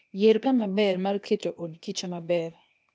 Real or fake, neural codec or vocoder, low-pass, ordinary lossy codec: fake; codec, 16 kHz, 0.8 kbps, ZipCodec; none; none